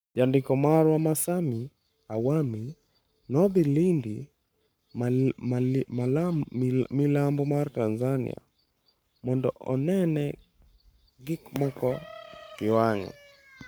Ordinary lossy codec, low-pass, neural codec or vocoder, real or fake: none; none; codec, 44.1 kHz, 7.8 kbps, Pupu-Codec; fake